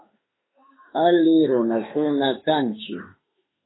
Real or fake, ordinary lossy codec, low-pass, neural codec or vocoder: fake; AAC, 16 kbps; 7.2 kHz; autoencoder, 48 kHz, 32 numbers a frame, DAC-VAE, trained on Japanese speech